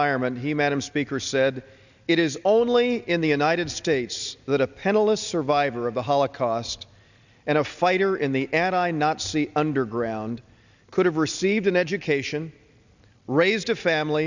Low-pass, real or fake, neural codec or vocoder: 7.2 kHz; real; none